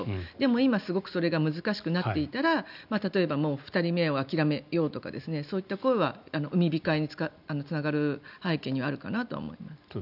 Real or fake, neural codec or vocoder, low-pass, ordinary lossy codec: real; none; 5.4 kHz; none